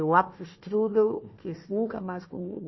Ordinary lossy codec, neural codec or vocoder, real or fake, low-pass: MP3, 24 kbps; codec, 16 kHz, 1 kbps, FunCodec, trained on Chinese and English, 50 frames a second; fake; 7.2 kHz